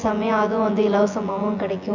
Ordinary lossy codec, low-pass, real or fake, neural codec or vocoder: none; 7.2 kHz; fake; vocoder, 24 kHz, 100 mel bands, Vocos